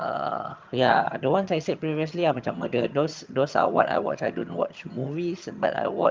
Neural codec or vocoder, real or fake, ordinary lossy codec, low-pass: vocoder, 22.05 kHz, 80 mel bands, HiFi-GAN; fake; Opus, 24 kbps; 7.2 kHz